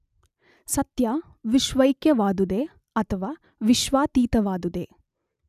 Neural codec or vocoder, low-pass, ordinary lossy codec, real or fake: none; 14.4 kHz; none; real